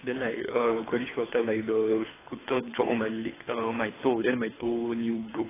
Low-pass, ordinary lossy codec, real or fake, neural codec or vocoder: 3.6 kHz; AAC, 16 kbps; fake; codec, 24 kHz, 3 kbps, HILCodec